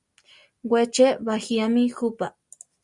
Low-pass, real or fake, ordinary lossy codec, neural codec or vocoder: 10.8 kHz; real; Opus, 64 kbps; none